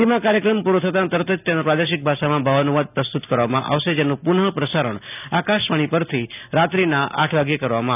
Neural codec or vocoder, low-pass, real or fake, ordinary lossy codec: none; 3.6 kHz; real; none